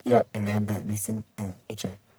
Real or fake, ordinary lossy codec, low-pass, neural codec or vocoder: fake; none; none; codec, 44.1 kHz, 1.7 kbps, Pupu-Codec